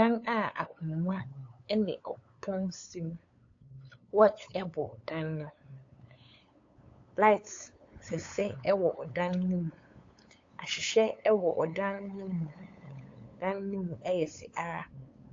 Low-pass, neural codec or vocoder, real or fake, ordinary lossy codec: 7.2 kHz; codec, 16 kHz, 8 kbps, FunCodec, trained on LibriTTS, 25 frames a second; fake; AAC, 48 kbps